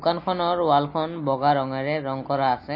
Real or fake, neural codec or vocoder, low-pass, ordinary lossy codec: real; none; 5.4 kHz; MP3, 32 kbps